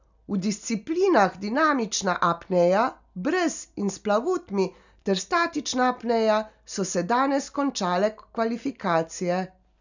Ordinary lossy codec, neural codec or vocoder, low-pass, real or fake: none; none; 7.2 kHz; real